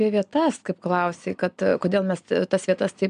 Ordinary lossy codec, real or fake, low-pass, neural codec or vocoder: MP3, 96 kbps; real; 9.9 kHz; none